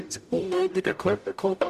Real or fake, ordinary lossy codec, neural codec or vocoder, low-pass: fake; none; codec, 44.1 kHz, 0.9 kbps, DAC; 14.4 kHz